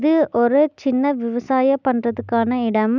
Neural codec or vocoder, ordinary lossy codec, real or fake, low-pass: none; none; real; 7.2 kHz